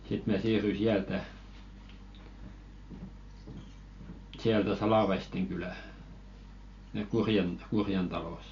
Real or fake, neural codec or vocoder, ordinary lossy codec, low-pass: real; none; AAC, 48 kbps; 7.2 kHz